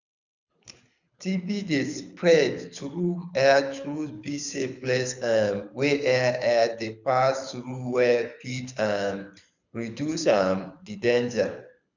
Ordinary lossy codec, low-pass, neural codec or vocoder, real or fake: none; 7.2 kHz; codec, 24 kHz, 6 kbps, HILCodec; fake